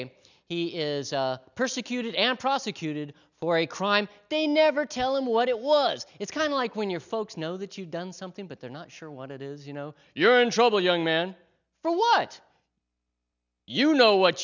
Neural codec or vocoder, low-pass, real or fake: none; 7.2 kHz; real